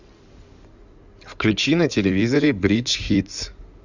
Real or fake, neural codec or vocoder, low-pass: fake; codec, 16 kHz in and 24 kHz out, 2.2 kbps, FireRedTTS-2 codec; 7.2 kHz